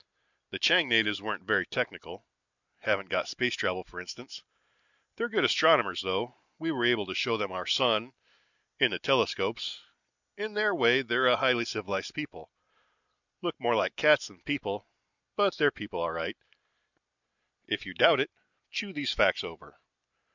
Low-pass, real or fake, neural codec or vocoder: 7.2 kHz; real; none